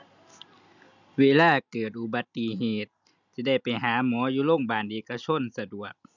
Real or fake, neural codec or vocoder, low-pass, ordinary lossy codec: real; none; 7.2 kHz; none